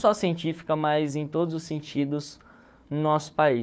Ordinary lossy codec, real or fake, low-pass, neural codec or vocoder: none; fake; none; codec, 16 kHz, 4 kbps, FunCodec, trained on Chinese and English, 50 frames a second